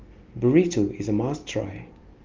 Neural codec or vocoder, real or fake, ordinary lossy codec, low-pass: none; real; Opus, 24 kbps; 7.2 kHz